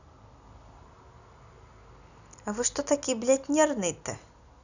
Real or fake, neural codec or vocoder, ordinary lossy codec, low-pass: real; none; none; 7.2 kHz